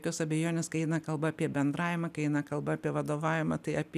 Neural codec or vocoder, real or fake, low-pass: none; real; 14.4 kHz